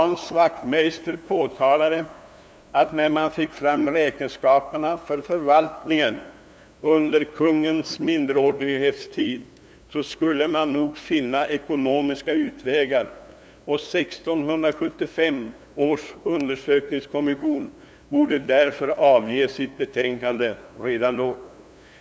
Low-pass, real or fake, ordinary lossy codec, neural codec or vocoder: none; fake; none; codec, 16 kHz, 2 kbps, FunCodec, trained on LibriTTS, 25 frames a second